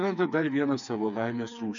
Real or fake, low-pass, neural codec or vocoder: fake; 7.2 kHz; codec, 16 kHz, 4 kbps, FreqCodec, smaller model